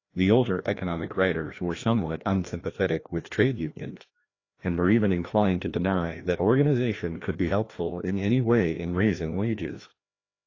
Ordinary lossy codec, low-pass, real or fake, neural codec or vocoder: AAC, 32 kbps; 7.2 kHz; fake; codec, 16 kHz, 1 kbps, FreqCodec, larger model